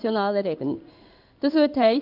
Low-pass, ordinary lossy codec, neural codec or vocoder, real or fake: 5.4 kHz; none; codec, 16 kHz in and 24 kHz out, 1 kbps, XY-Tokenizer; fake